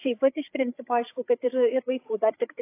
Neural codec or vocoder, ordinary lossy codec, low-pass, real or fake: codec, 16 kHz, 8 kbps, FreqCodec, larger model; AAC, 24 kbps; 3.6 kHz; fake